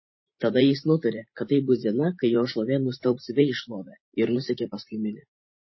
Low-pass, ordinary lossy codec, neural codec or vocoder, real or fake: 7.2 kHz; MP3, 24 kbps; vocoder, 44.1 kHz, 128 mel bands every 512 samples, BigVGAN v2; fake